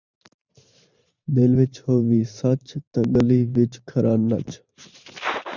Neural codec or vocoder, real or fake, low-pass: vocoder, 44.1 kHz, 128 mel bands every 512 samples, BigVGAN v2; fake; 7.2 kHz